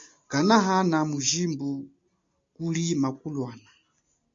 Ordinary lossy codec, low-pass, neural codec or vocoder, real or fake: MP3, 48 kbps; 7.2 kHz; none; real